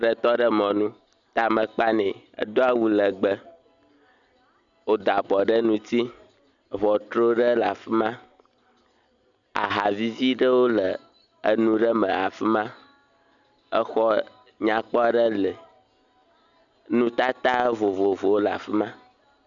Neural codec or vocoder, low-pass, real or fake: none; 7.2 kHz; real